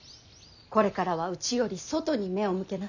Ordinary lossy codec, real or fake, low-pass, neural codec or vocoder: none; real; 7.2 kHz; none